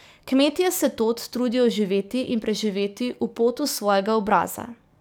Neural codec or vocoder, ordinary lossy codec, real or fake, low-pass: codec, 44.1 kHz, 7.8 kbps, DAC; none; fake; none